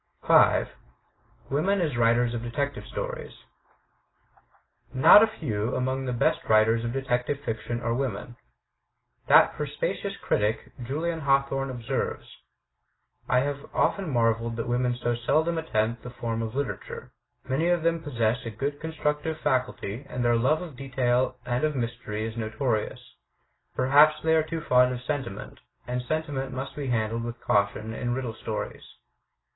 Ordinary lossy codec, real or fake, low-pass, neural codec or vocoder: AAC, 16 kbps; real; 7.2 kHz; none